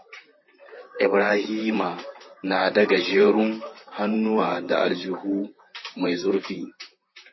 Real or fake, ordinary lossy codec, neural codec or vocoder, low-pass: fake; MP3, 24 kbps; vocoder, 44.1 kHz, 128 mel bands, Pupu-Vocoder; 7.2 kHz